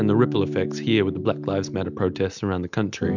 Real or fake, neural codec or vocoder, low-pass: real; none; 7.2 kHz